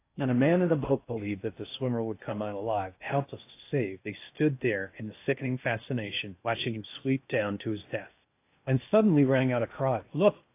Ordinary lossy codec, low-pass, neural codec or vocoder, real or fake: AAC, 24 kbps; 3.6 kHz; codec, 16 kHz in and 24 kHz out, 0.6 kbps, FocalCodec, streaming, 2048 codes; fake